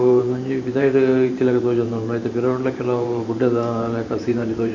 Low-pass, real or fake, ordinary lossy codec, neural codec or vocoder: 7.2 kHz; fake; MP3, 48 kbps; vocoder, 44.1 kHz, 128 mel bands every 512 samples, BigVGAN v2